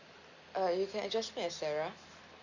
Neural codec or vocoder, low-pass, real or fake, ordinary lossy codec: none; 7.2 kHz; real; Opus, 64 kbps